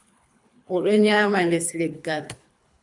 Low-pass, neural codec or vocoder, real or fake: 10.8 kHz; codec, 24 kHz, 3 kbps, HILCodec; fake